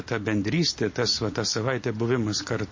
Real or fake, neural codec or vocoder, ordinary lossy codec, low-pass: real; none; MP3, 32 kbps; 7.2 kHz